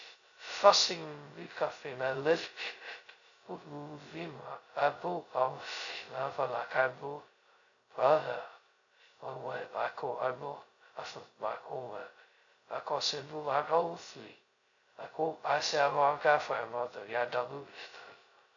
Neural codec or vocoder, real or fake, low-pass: codec, 16 kHz, 0.2 kbps, FocalCodec; fake; 7.2 kHz